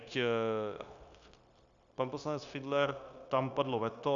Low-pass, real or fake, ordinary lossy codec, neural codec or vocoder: 7.2 kHz; fake; Opus, 64 kbps; codec, 16 kHz, 0.9 kbps, LongCat-Audio-Codec